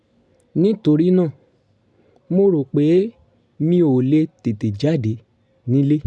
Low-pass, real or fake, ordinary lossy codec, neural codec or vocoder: none; real; none; none